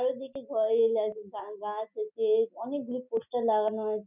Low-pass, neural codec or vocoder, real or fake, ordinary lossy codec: 3.6 kHz; none; real; none